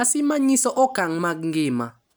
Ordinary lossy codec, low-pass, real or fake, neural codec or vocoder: none; none; real; none